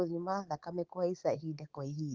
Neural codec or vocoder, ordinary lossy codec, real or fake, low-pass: codec, 24 kHz, 3.1 kbps, DualCodec; Opus, 16 kbps; fake; 7.2 kHz